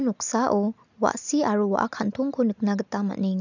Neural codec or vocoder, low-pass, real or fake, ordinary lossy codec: none; 7.2 kHz; real; none